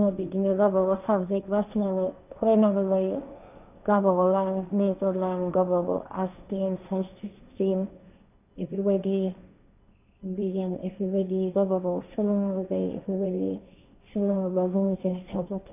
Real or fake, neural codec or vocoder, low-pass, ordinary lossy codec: fake; codec, 16 kHz, 1.1 kbps, Voila-Tokenizer; 3.6 kHz; none